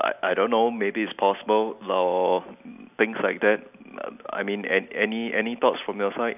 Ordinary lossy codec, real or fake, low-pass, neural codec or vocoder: none; real; 3.6 kHz; none